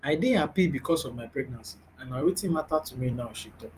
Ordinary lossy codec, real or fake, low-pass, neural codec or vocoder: Opus, 32 kbps; fake; 14.4 kHz; vocoder, 44.1 kHz, 128 mel bands every 256 samples, BigVGAN v2